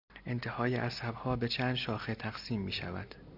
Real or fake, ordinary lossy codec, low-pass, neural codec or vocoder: real; Opus, 64 kbps; 5.4 kHz; none